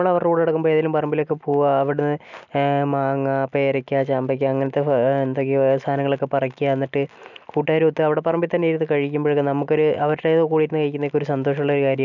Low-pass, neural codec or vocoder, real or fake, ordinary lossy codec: 7.2 kHz; none; real; none